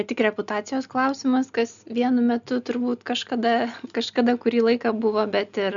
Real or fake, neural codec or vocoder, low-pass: real; none; 7.2 kHz